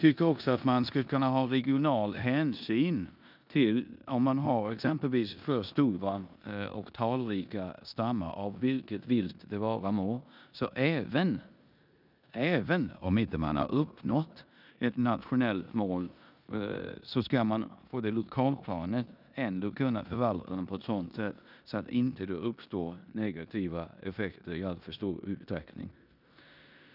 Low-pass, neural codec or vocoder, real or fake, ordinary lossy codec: 5.4 kHz; codec, 16 kHz in and 24 kHz out, 0.9 kbps, LongCat-Audio-Codec, four codebook decoder; fake; none